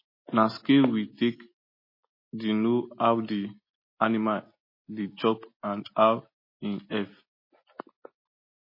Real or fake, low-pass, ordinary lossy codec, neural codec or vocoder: real; 5.4 kHz; MP3, 24 kbps; none